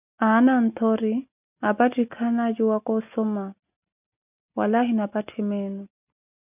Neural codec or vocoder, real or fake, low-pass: none; real; 3.6 kHz